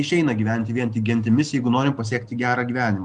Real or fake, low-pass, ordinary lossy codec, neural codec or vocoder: real; 9.9 kHz; Opus, 32 kbps; none